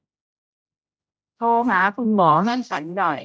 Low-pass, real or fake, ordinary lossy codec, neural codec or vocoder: none; fake; none; codec, 16 kHz, 0.5 kbps, X-Codec, HuBERT features, trained on general audio